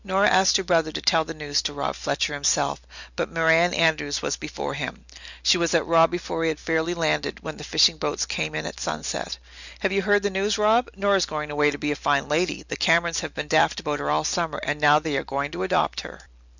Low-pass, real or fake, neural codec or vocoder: 7.2 kHz; real; none